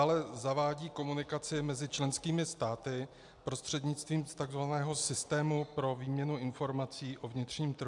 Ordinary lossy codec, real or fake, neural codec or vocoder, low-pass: AAC, 64 kbps; real; none; 10.8 kHz